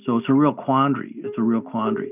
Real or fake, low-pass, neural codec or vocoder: real; 3.6 kHz; none